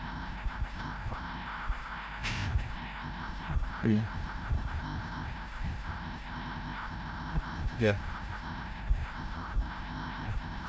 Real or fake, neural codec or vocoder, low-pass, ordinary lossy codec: fake; codec, 16 kHz, 0.5 kbps, FreqCodec, larger model; none; none